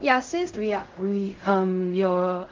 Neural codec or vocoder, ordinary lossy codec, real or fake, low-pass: codec, 16 kHz in and 24 kHz out, 0.4 kbps, LongCat-Audio-Codec, fine tuned four codebook decoder; Opus, 24 kbps; fake; 7.2 kHz